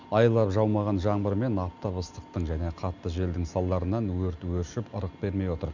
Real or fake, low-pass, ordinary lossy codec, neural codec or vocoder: real; 7.2 kHz; none; none